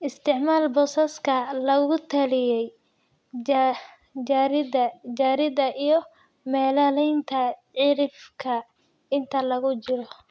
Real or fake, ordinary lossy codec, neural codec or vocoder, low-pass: real; none; none; none